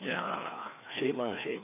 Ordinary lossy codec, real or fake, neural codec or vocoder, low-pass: none; fake; codec, 16 kHz, 2 kbps, FreqCodec, larger model; 3.6 kHz